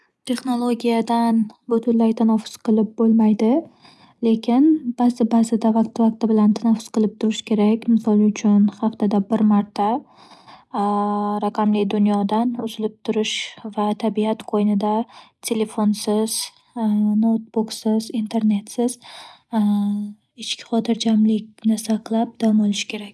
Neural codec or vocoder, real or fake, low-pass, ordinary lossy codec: none; real; none; none